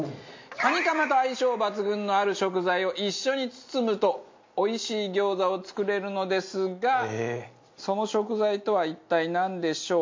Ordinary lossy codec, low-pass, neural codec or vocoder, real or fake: MP3, 48 kbps; 7.2 kHz; none; real